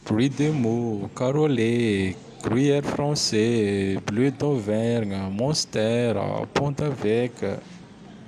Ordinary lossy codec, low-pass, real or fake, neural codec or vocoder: AAC, 96 kbps; 14.4 kHz; real; none